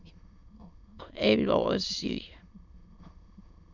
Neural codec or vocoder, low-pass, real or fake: autoencoder, 22.05 kHz, a latent of 192 numbers a frame, VITS, trained on many speakers; 7.2 kHz; fake